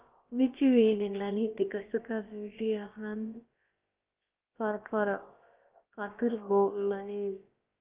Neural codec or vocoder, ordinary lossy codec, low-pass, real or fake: codec, 16 kHz, about 1 kbps, DyCAST, with the encoder's durations; Opus, 24 kbps; 3.6 kHz; fake